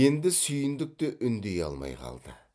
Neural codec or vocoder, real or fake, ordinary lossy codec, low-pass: none; real; none; none